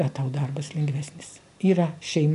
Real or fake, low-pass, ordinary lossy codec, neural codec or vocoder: real; 10.8 kHz; AAC, 96 kbps; none